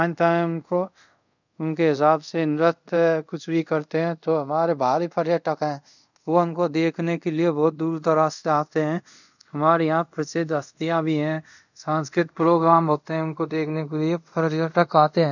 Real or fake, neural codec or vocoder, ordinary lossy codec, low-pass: fake; codec, 24 kHz, 0.5 kbps, DualCodec; none; 7.2 kHz